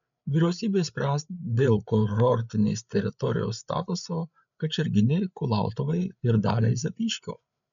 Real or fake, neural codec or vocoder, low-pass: fake; codec, 16 kHz, 8 kbps, FreqCodec, larger model; 7.2 kHz